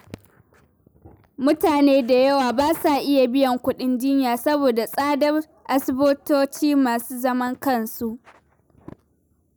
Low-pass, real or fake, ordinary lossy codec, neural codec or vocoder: none; real; none; none